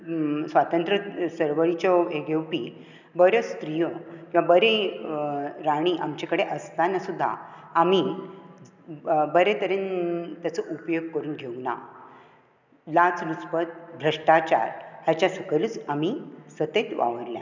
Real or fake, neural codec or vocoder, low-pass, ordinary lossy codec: real; none; 7.2 kHz; none